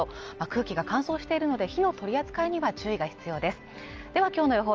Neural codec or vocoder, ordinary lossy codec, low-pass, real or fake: none; Opus, 24 kbps; 7.2 kHz; real